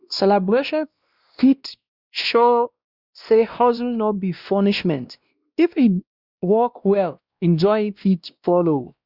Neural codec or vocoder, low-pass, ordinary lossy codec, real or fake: codec, 16 kHz, 1 kbps, X-Codec, WavLM features, trained on Multilingual LibriSpeech; 5.4 kHz; Opus, 64 kbps; fake